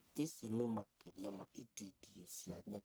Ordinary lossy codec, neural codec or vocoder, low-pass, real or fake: none; codec, 44.1 kHz, 1.7 kbps, Pupu-Codec; none; fake